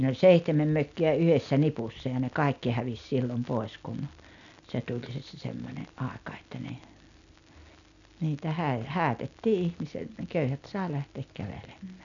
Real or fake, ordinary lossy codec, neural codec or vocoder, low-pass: real; none; none; 7.2 kHz